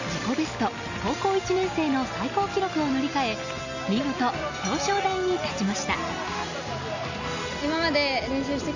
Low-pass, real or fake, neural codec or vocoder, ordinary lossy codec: 7.2 kHz; real; none; none